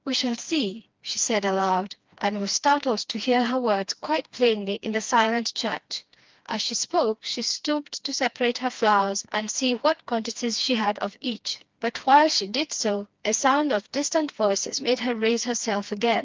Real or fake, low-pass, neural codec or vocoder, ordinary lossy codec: fake; 7.2 kHz; codec, 16 kHz, 2 kbps, FreqCodec, smaller model; Opus, 32 kbps